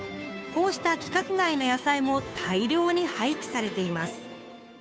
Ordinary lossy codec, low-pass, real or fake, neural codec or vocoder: none; none; fake; codec, 16 kHz, 2 kbps, FunCodec, trained on Chinese and English, 25 frames a second